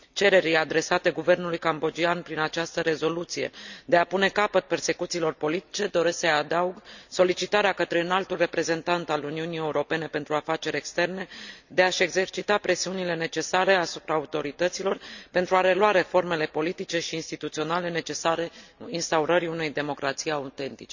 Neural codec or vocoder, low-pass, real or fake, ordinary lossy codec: none; 7.2 kHz; real; none